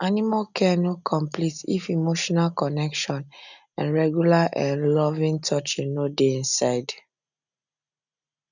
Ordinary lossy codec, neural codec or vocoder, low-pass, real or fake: none; none; 7.2 kHz; real